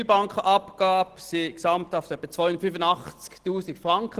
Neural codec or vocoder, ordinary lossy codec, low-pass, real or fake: none; Opus, 16 kbps; 14.4 kHz; real